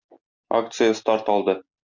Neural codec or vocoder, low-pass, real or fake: none; 7.2 kHz; real